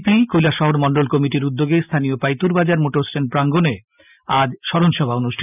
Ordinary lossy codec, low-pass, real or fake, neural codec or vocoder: none; 3.6 kHz; real; none